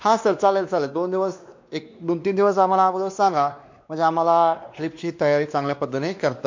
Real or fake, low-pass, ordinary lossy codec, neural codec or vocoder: fake; 7.2 kHz; MP3, 48 kbps; codec, 16 kHz, 2 kbps, X-Codec, WavLM features, trained on Multilingual LibriSpeech